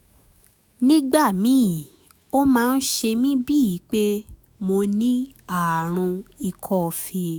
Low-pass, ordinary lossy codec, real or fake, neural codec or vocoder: none; none; fake; autoencoder, 48 kHz, 128 numbers a frame, DAC-VAE, trained on Japanese speech